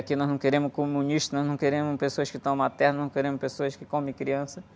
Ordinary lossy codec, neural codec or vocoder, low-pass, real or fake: none; none; none; real